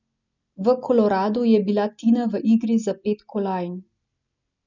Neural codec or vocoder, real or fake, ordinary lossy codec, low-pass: none; real; none; 7.2 kHz